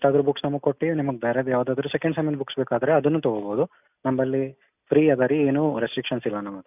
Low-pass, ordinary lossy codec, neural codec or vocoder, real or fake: 3.6 kHz; none; none; real